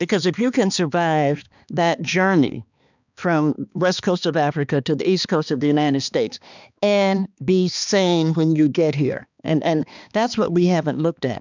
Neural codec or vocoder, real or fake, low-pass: codec, 16 kHz, 2 kbps, X-Codec, HuBERT features, trained on balanced general audio; fake; 7.2 kHz